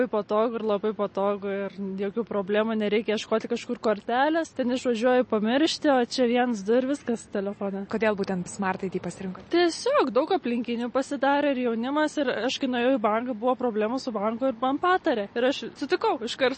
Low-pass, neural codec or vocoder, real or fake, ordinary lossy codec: 10.8 kHz; none; real; MP3, 32 kbps